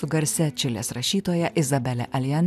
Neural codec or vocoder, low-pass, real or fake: none; 14.4 kHz; real